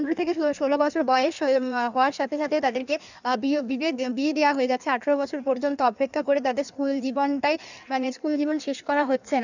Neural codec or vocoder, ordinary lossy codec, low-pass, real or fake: codec, 16 kHz in and 24 kHz out, 1.1 kbps, FireRedTTS-2 codec; none; 7.2 kHz; fake